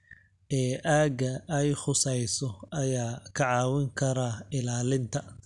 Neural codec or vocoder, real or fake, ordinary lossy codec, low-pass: none; real; none; 10.8 kHz